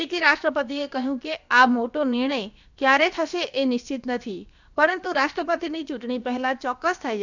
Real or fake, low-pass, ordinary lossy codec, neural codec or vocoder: fake; 7.2 kHz; none; codec, 16 kHz, about 1 kbps, DyCAST, with the encoder's durations